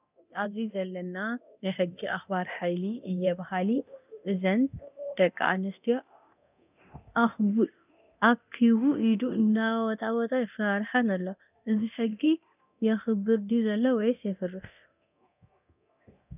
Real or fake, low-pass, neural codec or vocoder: fake; 3.6 kHz; codec, 24 kHz, 0.9 kbps, DualCodec